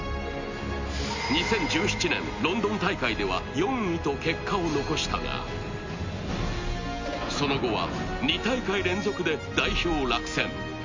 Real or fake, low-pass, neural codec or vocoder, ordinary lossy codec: real; 7.2 kHz; none; MP3, 48 kbps